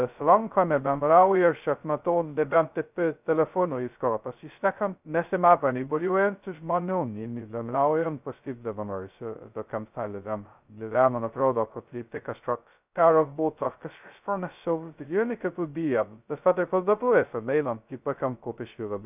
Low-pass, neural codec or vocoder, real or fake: 3.6 kHz; codec, 16 kHz, 0.2 kbps, FocalCodec; fake